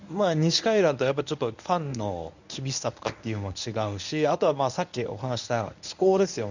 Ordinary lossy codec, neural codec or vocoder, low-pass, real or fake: none; codec, 24 kHz, 0.9 kbps, WavTokenizer, medium speech release version 1; 7.2 kHz; fake